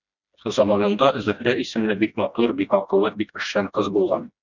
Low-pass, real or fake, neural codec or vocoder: 7.2 kHz; fake; codec, 16 kHz, 1 kbps, FreqCodec, smaller model